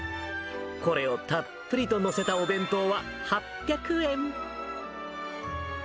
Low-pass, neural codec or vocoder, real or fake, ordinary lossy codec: none; none; real; none